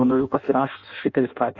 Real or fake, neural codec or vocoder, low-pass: fake; codec, 16 kHz in and 24 kHz out, 0.6 kbps, FireRedTTS-2 codec; 7.2 kHz